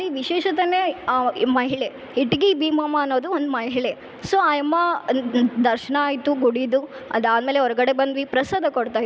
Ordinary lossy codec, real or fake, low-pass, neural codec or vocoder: none; real; none; none